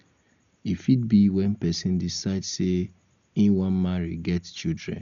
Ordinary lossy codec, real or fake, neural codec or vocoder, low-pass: none; real; none; 7.2 kHz